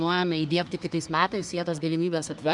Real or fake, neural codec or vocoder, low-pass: fake; codec, 24 kHz, 1 kbps, SNAC; 10.8 kHz